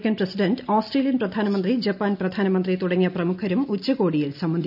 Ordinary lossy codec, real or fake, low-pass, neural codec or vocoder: none; real; 5.4 kHz; none